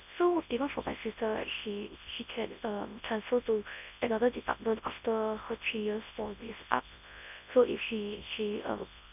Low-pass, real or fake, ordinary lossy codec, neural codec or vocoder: 3.6 kHz; fake; none; codec, 24 kHz, 0.9 kbps, WavTokenizer, large speech release